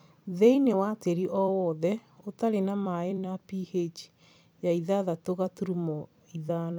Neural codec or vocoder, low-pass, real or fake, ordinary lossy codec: vocoder, 44.1 kHz, 128 mel bands every 256 samples, BigVGAN v2; none; fake; none